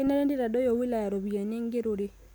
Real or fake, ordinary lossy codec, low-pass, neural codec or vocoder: real; none; none; none